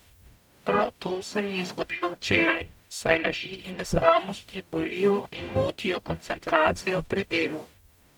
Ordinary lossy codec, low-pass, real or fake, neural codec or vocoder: none; 19.8 kHz; fake; codec, 44.1 kHz, 0.9 kbps, DAC